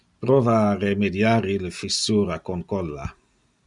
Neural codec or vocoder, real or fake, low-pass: none; real; 10.8 kHz